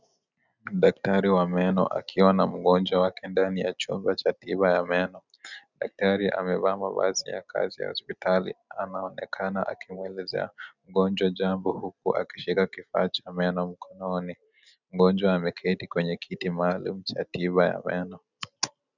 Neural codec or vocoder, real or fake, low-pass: none; real; 7.2 kHz